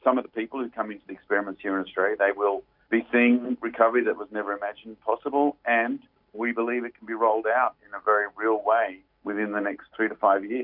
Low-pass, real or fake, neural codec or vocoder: 5.4 kHz; real; none